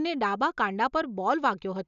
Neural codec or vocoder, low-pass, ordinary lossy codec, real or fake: none; 7.2 kHz; none; real